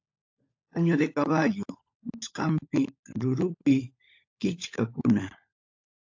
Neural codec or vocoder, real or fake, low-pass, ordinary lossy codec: codec, 16 kHz, 16 kbps, FunCodec, trained on LibriTTS, 50 frames a second; fake; 7.2 kHz; AAC, 48 kbps